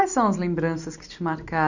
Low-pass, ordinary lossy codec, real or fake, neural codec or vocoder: 7.2 kHz; none; real; none